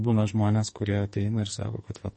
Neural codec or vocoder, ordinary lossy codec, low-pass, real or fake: codec, 44.1 kHz, 2.6 kbps, SNAC; MP3, 32 kbps; 10.8 kHz; fake